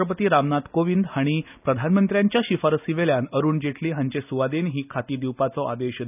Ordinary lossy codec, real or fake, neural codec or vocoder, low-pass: none; real; none; 3.6 kHz